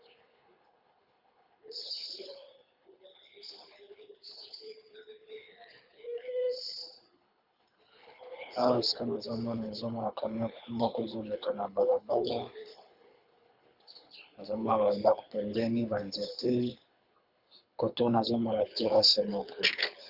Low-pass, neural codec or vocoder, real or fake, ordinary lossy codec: 5.4 kHz; codec, 24 kHz, 3 kbps, HILCodec; fake; Opus, 64 kbps